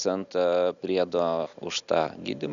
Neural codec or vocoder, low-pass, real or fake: none; 7.2 kHz; real